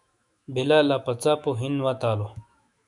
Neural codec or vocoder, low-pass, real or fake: autoencoder, 48 kHz, 128 numbers a frame, DAC-VAE, trained on Japanese speech; 10.8 kHz; fake